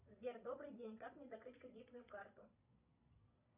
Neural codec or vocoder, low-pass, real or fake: vocoder, 22.05 kHz, 80 mel bands, Vocos; 3.6 kHz; fake